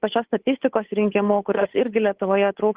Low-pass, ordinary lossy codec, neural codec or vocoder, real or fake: 3.6 kHz; Opus, 16 kbps; codec, 16 kHz, 8 kbps, FunCodec, trained on Chinese and English, 25 frames a second; fake